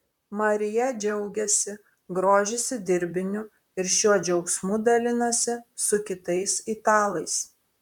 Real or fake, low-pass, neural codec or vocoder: fake; 19.8 kHz; vocoder, 44.1 kHz, 128 mel bands, Pupu-Vocoder